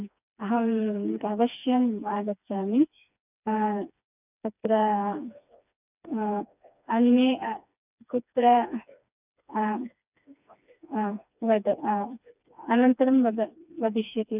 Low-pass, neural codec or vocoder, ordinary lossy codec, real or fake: 3.6 kHz; codec, 16 kHz, 2 kbps, FreqCodec, smaller model; none; fake